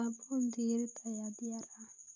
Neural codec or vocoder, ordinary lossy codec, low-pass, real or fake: none; none; 7.2 kHz; real